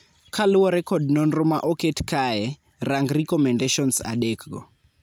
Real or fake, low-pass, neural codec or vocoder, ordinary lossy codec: real; none; none; none